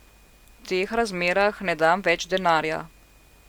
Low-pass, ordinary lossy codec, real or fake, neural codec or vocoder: 19.8 kHz; Opus, 64 kbps; real; none